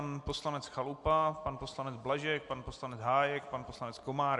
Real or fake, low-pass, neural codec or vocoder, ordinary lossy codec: real; 10.8 kHz; none; MP3, 64 kbps